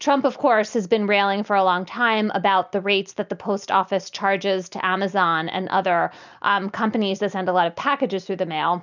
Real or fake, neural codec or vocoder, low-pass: real; none; 7.2 kHz